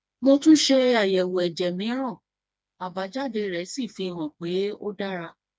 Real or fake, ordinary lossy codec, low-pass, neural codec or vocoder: fake; none; none; codec, 16 kHz, 2 kbps, FreqCodec, smaller model